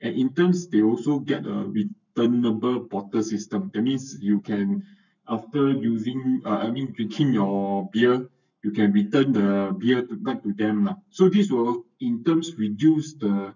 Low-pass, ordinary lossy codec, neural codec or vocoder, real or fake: 7.2 kHz; none; codec, 44.1 kHz, 7.8 kbps, Pupu-Codec; fake